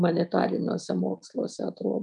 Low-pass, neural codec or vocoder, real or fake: 10.8 kHz; none; real